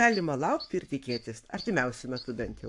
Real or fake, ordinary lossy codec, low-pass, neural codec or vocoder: fake; AAC, 64 kbps; 10.8 kHz; codec, 44.1 kHz, 7.8 kbps, Pupu-Codec